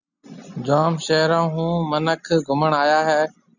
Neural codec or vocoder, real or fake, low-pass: none; real; 7.2 kHz